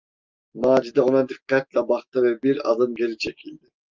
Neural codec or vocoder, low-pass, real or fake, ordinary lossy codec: none; 7.2 kHz; real; Opus, 32 kbps